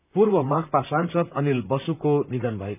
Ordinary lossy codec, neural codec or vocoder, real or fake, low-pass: none; vocoder, 44.1 kHz, 128 mel bands, Pupu-Vocoder; fake; 3.6 kHz